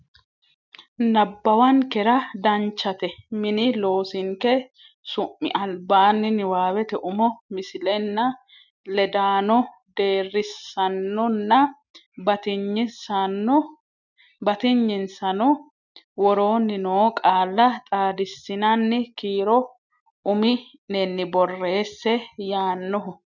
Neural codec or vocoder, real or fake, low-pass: none; real; 7.2 kHz